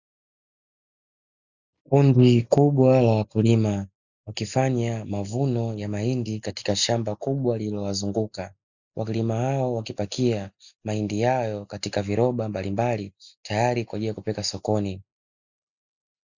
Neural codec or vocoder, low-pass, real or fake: none; 7.2 kHz; real